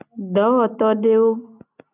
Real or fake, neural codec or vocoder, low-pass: real; none; 3.6 kHz